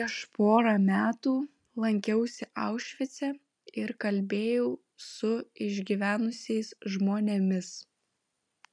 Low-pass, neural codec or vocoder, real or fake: 9.9 kHz; none; real